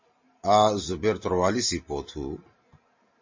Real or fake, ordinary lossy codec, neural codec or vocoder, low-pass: real; MP3, 32 kbps; none; 7.2 kHz